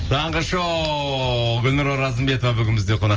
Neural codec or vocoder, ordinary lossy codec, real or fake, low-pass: none; Opus, 24 kbps; real; 7.2 kHz